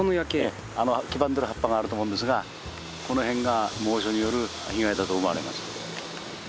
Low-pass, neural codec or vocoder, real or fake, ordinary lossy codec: none; none; real; none